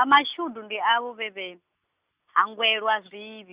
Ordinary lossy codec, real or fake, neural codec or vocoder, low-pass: Opus, 64 kbps; real; none; 3.6 kHz